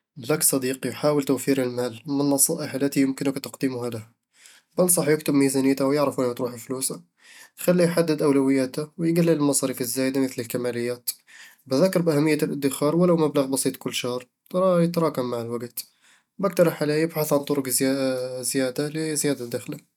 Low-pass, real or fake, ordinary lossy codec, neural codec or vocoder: 19.8 kHz; real; none; none